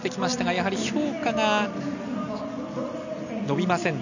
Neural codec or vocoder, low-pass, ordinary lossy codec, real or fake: none; 7.2 kHz; none; real